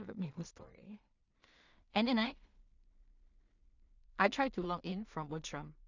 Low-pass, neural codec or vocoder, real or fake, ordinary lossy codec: 7.2 kHz; codec, 16 kHz in and 24 kHz out, 0.4 kbps, LongCat-Audio-Codec, two codebook decoder; fake; none